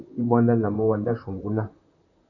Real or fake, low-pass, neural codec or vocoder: fake; 7.2 kHz; vocoder, 44.1 kHz, 128 mel bands, Pupu-Vocoder